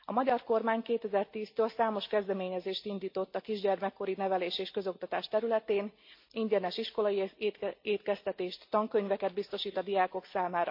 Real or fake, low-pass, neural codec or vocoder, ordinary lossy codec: real; 5.4 kHz; none; MP3, 32 kbps